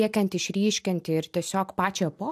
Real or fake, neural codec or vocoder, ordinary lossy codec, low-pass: real; none; AAC, 96 kbps; 14.4 kHz